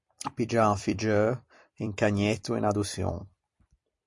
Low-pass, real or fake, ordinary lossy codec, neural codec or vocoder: 10.8 kHz; fake; MP3, 48 kbps; vocoder, 44.1 kHz, 128 mel bands every 256 samples, BigVGAN v2